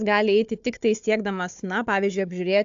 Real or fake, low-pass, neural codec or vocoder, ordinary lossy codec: fake; 7.2 kHz; codec, 16 kHz, 4 kbps, FunCodec, trained on Chinese and English, 50 frames a second; AAC, 64 kbps